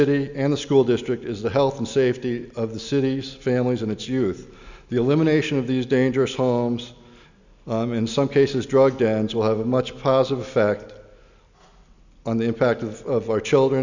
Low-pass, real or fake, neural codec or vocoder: 7.2 kHz; real; none